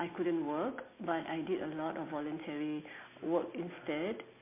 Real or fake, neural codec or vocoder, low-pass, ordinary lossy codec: real; none; 3.6 kHz; MP3, 24 kbps